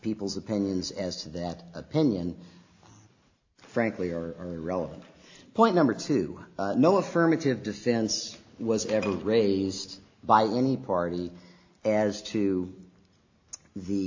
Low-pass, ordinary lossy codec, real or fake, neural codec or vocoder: 7.2 kHz; AAC, 48 kbps; real; none